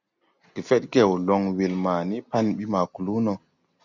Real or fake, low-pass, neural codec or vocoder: real; 7.2 kHz; none